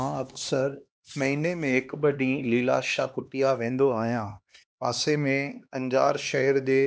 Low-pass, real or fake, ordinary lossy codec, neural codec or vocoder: none; fake; none; codec, 16 kHz, 2 kbps, X-Codec, HuBERT features, trained on LibriSpeech